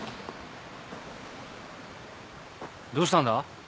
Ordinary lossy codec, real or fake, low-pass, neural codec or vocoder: none; real; none; none